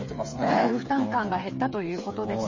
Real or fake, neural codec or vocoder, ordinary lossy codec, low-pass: fake; codec, 16 kHz, 8 kbps, FreqCodec, smaller model; MP3, 32 kbps; 7.2 kHz